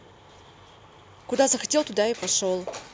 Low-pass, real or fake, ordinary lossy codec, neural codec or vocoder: none; real; none; none